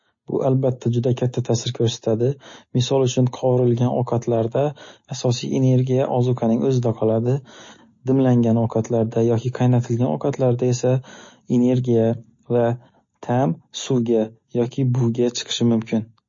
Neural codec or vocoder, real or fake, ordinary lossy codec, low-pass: none; real; MP3, 32 kbps; 7.2 kHz